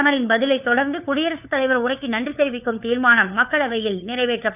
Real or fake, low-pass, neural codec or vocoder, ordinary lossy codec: fake; 3.6 kHz; codec, 16 kHz, 4 kbps, FunCodec, trained on LibriTTS, 50 frames a second; none